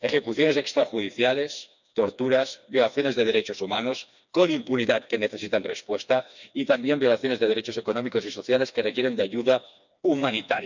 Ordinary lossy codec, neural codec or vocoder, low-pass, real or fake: none; codec, 16 kHz, 2 kbps, FreqCodec, smaller model; 7.2 kHz; fake